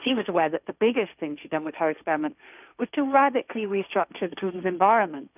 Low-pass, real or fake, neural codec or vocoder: 3.6 kHz; fake; codec, 16 kHz, 1.1 kbps, Voila-Tokenizer